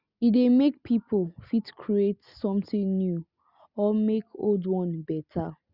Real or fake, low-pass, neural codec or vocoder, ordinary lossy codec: real; 5.4 kHz; none; Opus, 64 kbps